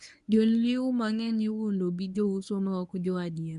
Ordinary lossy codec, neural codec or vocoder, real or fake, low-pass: none; codec, 24 kHz, 0.9 kbps, WavTokenizer, medium speech release version 1; fake; 10.8 kHz